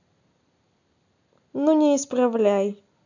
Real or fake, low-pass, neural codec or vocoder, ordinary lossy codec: real; 7.2 kHz; none; none